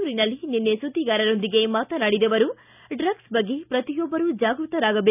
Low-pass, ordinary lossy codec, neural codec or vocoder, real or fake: 3.6 kHz; none; none; real